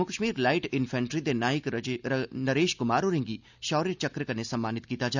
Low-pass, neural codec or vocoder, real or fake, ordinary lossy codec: 7.2 kHz; none; real; none